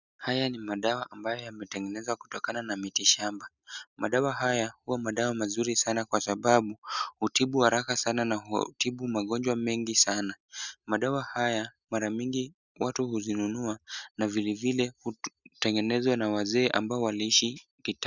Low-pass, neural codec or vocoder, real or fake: 7.2 kHz; none; real